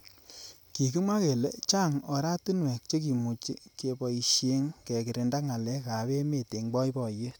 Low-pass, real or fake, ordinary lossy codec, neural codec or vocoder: none; real; none; none